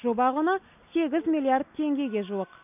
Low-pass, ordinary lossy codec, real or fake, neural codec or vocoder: 3.6 kHz; none; real; none